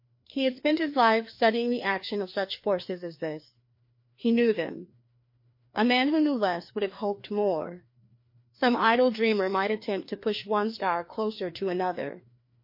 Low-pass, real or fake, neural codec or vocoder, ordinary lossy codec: 5.4 kHz; fake; codec, 16 kHz, 2 kbps, FreqCodec, larger model; MP3, 32 kbps